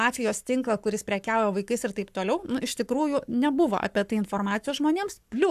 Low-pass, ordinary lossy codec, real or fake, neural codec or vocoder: 14.4 kHz; AAC, 96 kbps; fake; codec, 44.1 kHz, 7.8 kbps, Pupu-Codec